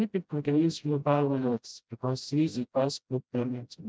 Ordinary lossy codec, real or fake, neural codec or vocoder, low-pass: none; fake; codec, 16 kHz, 0.5 kbps, FreqCodec, smaller model; none